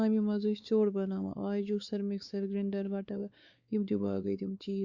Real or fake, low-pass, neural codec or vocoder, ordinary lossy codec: fake; 7.2 kHz; codec, 16 kHz, 4 kbps, X-Codec, WavLM features, trained on Multilingual LibriSpeech; Opus, 64 kbps